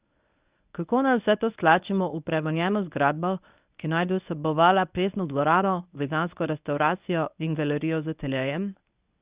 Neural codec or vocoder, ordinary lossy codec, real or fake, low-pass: codec, 24 kHz, 0.9 kbps, WavTokenizer, medium speech release version 1; Opus, 64 kbps; fake; 3.6 kHz